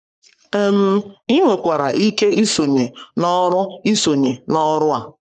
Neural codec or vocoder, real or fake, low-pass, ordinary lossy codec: codec, 44.1 kHz, 3.4 kbps, Pupu-Codec; fake; 10.8 kHz; none